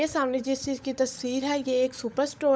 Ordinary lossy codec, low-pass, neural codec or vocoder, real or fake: none; none; codec, 16 kHz, 16 kbps, FunCodec, trained on LibriTTS, 50 frames a second; fake